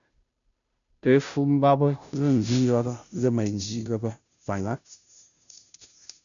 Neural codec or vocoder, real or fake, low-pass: codec, 16 kHz, 0.5 kbps, FunCodec, trained on Chinese and English, 25 frames a second; fake; 7.2 kHz